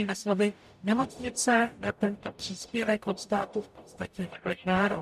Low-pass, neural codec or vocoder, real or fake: 14.4 kHz; codec, 44.1 kHz, 0.9 kbps, DAC; fake